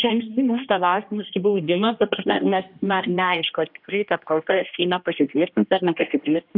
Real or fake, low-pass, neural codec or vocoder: fake; 5.4 kHz; codec, 16 kHz, 1 kbps, X-Codec, HuBERT features, trained on general audio